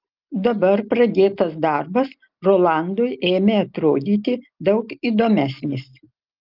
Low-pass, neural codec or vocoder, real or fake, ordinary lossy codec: 5.4 kHz; none; real; Opus, 24 kbps